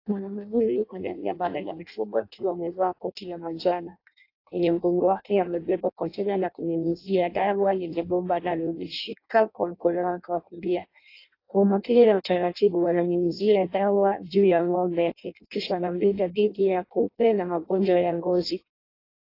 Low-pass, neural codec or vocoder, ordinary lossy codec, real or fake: 5.4 kHz; codec, 16 kHz in and 24 kHz out, 0.6 kbps, FireRedTTS-2 codec; AAC, 32 kbps; fake